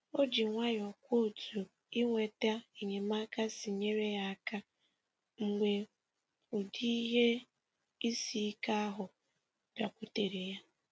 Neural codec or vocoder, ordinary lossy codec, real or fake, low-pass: none; none; real; none